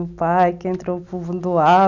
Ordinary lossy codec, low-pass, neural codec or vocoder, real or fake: none; 7.2 kHz; none; real